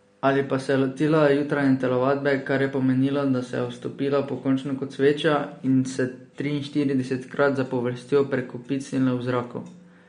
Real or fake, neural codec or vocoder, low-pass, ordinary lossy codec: real; none; 9.9 kHz; MP3, 48 kbps